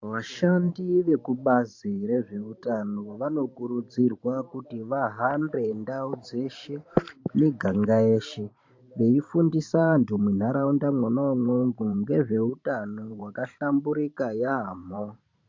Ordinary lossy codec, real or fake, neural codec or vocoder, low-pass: MP3, 48 kbps; fake; vocoder, 44.1 kHz, 128 mel bands every 512 samples, BigVGAN v2; 7.2 kHz